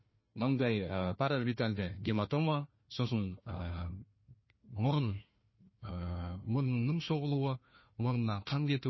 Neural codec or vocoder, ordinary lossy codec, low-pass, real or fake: codec, 16 kHz, 1 kbps, FunCodec, trained on Chinese and English, 50 frames a second; MP3, 24 kbps; 7.2 kHz; fake